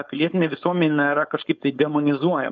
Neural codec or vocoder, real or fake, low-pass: codec, 16 kHz, 4.8 kbps, FACodec; fake; 7.2 kHz